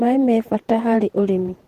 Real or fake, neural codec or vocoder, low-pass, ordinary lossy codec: fake; vocoder, 48 kHz, 128 mel bands, Vocos; 14.4 kHz; Opus, 16 kbps